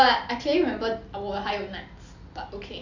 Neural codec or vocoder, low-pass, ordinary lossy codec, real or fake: none; 7.2 kHz; none; real